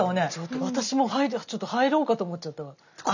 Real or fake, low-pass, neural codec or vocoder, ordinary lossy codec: real; 7.2 kHz; none; none